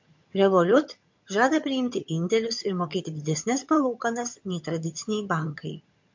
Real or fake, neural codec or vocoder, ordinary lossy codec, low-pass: fake; vocoder, 22.05 kHz, 80 mel bands, HiFi-GAN; MP3, 48 kbps; 7.2 kHz